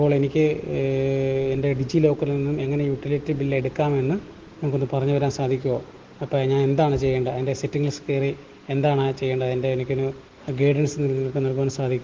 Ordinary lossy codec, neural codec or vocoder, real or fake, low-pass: Opus, 16 kbps; none; real; 7.2 kHz